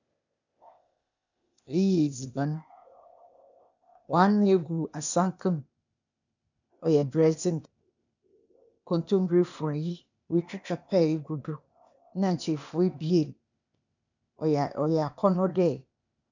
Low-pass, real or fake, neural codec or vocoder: 7.2 kHz; fake; codec, 16 kHz, 0.8 kbps, ZipCodec